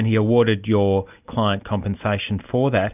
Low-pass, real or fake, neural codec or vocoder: 3.6 kHz; real; none